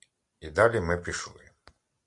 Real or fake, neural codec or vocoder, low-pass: real; none; 10.8 kHz